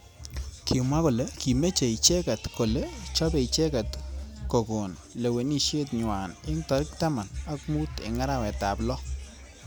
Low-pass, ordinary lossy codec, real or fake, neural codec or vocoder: none; none; real; none